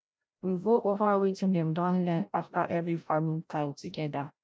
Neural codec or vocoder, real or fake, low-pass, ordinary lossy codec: codec, 16 kHz, 0.5 kbps, FreqCodec, larger model; fake; none; none